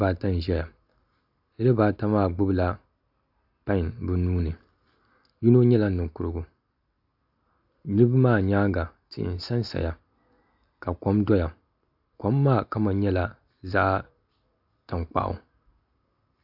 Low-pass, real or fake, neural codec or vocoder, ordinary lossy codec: 5.4 kHz; real; none; AAC, 48 kbps